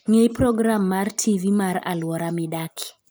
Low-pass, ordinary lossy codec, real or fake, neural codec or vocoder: none; none; real; none